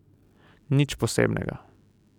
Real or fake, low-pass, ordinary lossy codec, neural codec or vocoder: real; 19.8 kHz; none; none